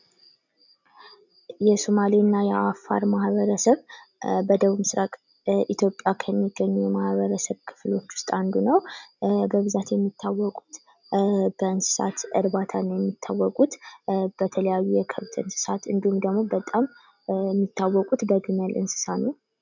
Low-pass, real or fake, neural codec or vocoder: 7.2 kHz; real; none